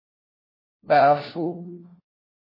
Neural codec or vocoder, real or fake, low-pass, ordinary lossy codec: codec, 16 kHz, 1 kbps, FunCodec, trained on LibriTTS, 50 frames a second; fake; 5.4 kHz; MP3, 24 kbps